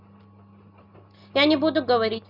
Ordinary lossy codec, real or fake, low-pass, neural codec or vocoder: none; real; 5.4 kHz; none